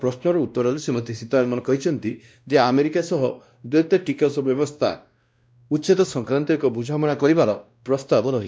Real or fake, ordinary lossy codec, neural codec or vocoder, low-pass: fake; none; codec, 16 kHz, 1 kbps, X-Codec, WavLM features, trained on Multilingual LibriSpeech; none